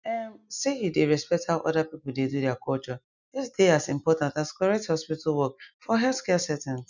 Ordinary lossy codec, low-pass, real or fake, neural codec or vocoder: none; 7.2 kHz; real; none